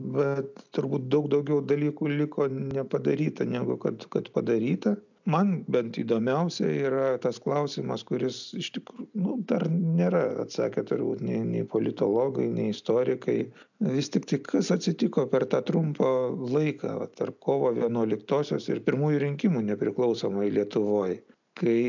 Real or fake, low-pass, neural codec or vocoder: real; 7.2 kHz; none